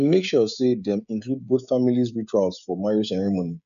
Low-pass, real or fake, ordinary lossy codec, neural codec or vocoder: 7.2 kHz; fake; none; codec, 16 kHz, 16 kbps, FreqCodec, smaller model